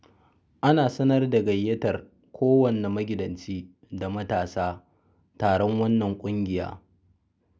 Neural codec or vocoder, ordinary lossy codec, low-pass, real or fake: none; none; none; real